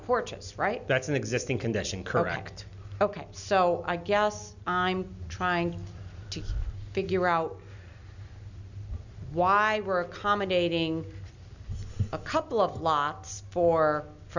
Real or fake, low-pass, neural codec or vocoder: real; 7.2 kHz; none